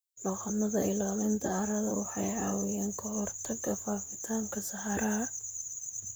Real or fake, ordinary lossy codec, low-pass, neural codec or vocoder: fake; none; none; vocoder, 44.1 kHz, 128 mel bands, Pupu-Vocoder